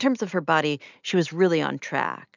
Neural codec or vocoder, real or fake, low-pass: none; real; 7.2 kHz